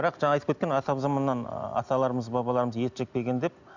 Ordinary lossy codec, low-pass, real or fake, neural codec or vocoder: none; 7.2 kHz; real; none